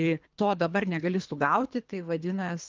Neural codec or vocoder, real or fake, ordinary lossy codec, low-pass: codec, 24 kHz, 3 kbps, HILCodec; fake; Opus, 24 kbps; 7.2 kHz